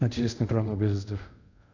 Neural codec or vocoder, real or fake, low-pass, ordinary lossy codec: codec, 24 kHz, 0.5 kbps, DualCodec; fake; 7.2 kHz; none